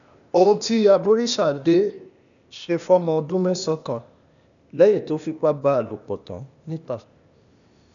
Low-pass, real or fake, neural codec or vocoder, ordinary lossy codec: 7.2 kHz; fake; codec, 16 kHz, 0.8 kbps, ZipCodec; none